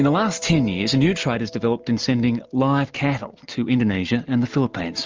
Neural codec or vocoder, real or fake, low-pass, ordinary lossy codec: none; real; 7.2 kHz; Opus, 24 kbps